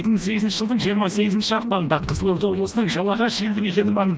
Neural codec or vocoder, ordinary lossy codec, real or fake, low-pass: codec, 16 kHz, 1 kbps, FreqCodec, smaller model; none; fake; none